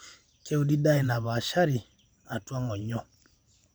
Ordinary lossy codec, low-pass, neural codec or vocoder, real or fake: none; none; vocoder, 44.1 kHz, 128 mel bands every 512 samples, BigVGAN v2; fake